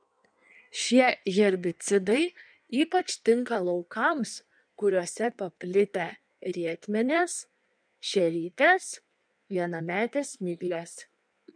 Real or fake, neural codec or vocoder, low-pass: fake; codec, 16 kHz in and 24 kHz out, 1.1 kbps, FireRedTTS-2 codec; 9.9 kHz